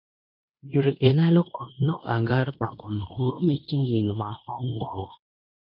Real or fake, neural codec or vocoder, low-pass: fake; codec, 16 kHz in and 24 kHz out, 0.9 kbps, LongCat-Audio-Codec, fine tuned four codebook decoder; 5.4 kHz